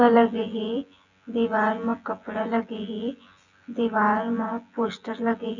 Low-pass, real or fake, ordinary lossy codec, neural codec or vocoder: 7.2 kHz; fake; none; vocoder, 24 kHz, 100 mel bands, Vocos